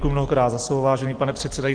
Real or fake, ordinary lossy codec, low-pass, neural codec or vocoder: real; Opus, 16 kbps; 9.9 kHz; none